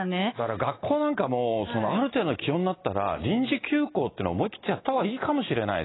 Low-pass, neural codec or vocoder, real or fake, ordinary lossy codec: 7.2 kHz; none; real; AAC, 16 kbps